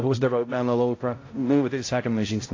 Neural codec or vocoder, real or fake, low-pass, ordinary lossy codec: codec, 16 kHz, 0.5 kbps, X-Codec, HuBERT features, trained on balanced general audio; fake; 7.2 kHz; AAC, 32 kbps